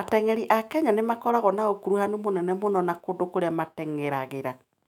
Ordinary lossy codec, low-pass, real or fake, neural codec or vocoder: none; 19.8 kHz; fake; autoencoder, 48 kHz, 128 numbers a frame, DAC-VAE, trained on Japanese speech